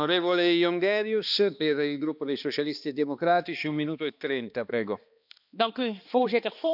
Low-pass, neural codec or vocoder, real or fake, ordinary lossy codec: 5.4 kHz; codec, 16 kHz, 2 kbps, X-Codec, HuBERT features, trained on balanced general audio; fake; none